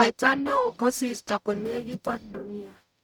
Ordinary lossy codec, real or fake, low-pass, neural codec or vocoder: none; fake; 19.8 kHz; codec, 44.1 kHz, 0.9 kbps, DAC